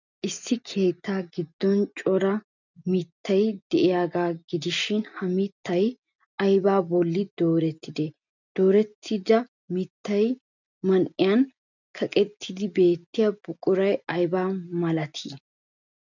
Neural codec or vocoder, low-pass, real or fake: none; 7.2 kHz; real